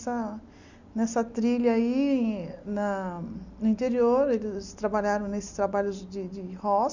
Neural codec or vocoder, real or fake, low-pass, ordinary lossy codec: none; real; 7.2 kHz; none